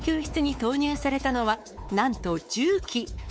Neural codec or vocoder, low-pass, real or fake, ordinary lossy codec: codec, 16 kHz, 4 kbps, X-Codec, WavLM features, trained on Multilingual LibriSpeech; none; fake; none